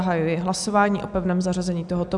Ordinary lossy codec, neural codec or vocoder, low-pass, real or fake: MP3, 96 kbps; none; 10.8 kHz; real